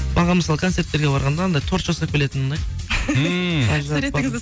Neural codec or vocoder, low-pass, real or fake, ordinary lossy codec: none; none; real; none